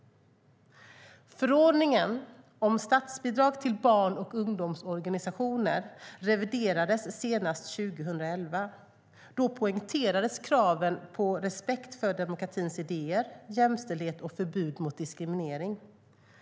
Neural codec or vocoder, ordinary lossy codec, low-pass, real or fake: none; none; none; real